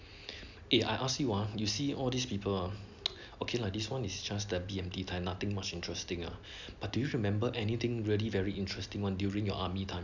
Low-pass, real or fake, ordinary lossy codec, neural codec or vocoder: 7.2 kHz; real; none; none